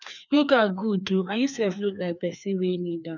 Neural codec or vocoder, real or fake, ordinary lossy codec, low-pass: codec, 16 kHz, 2 kbps, FreqCodec, larger model; fake; none; 7.2 kHz